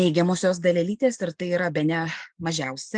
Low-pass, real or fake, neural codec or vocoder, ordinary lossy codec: 9.9 kHz; real; none; Opus, 32 kbps